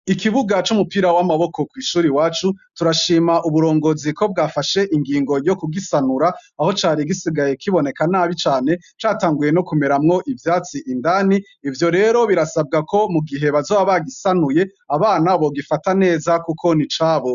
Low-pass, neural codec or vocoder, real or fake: 7.2 kHz; none; real